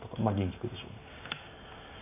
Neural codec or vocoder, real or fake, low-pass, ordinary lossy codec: none; real; 3.6 kHz; AAC, 32 kbps